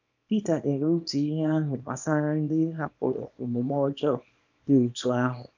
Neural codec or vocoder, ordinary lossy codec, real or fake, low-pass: codec, 24 kHz, 0.9 kbps, WavTokenizer, small release; none; fake; 7.2 kHz